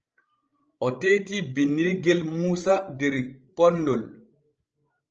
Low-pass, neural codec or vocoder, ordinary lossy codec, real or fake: 7.2 kHz; codec, 16 kHz, 16 kbps, FreqCodec, larger model; Opus, 24 kbps; fake